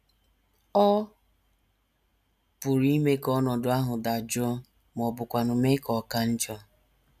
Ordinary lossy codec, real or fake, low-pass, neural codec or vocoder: none; real; 14.4 kHz; none